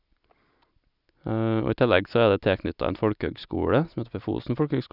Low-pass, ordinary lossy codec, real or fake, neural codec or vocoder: 5.4 kHz; none; real; none